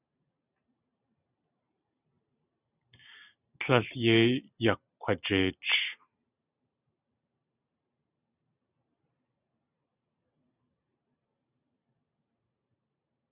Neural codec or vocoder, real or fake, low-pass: vocoder, 44.1 kHz, 128 mel bands every 512 samples, BigVGAN v2; fake; 3.6 kHz